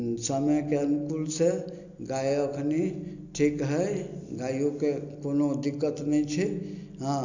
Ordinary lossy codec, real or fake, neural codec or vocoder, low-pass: none; real; none; 7.2 kHz